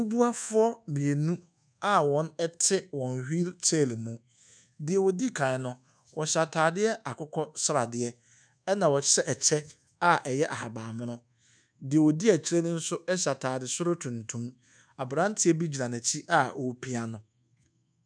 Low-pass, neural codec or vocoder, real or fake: 9.9 kHz; codec, 24 kHz, 1.2 kbps, DualCodec; fake